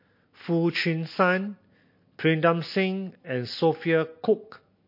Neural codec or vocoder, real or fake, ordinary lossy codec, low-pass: none; real; MP3, 32 kbps; 5.4 kHz